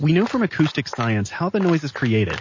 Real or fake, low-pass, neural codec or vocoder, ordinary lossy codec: real; 7.2 kHz; none; MP3, 32 kbps